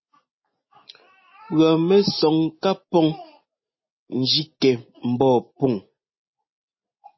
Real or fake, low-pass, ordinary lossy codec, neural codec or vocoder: real; 7.2 kHz; MP3, 24 kbps; none